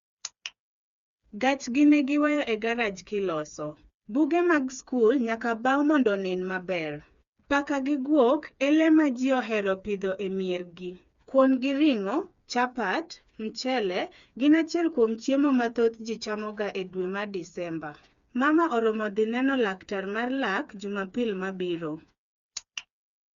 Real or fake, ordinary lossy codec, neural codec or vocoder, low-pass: fake; Opus, 64 kbps; codec, 16 kHz, 4 kbps, FreqCodec, smaller model; 7.2 kHz